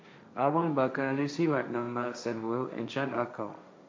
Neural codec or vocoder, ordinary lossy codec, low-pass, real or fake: codec, 16 kHz, 1.1 kbps, Voila-Tokenizer; none; none; fake